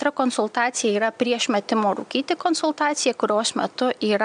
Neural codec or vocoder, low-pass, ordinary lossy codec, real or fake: none; 9.9 kHz; MP3, 96 kbps; real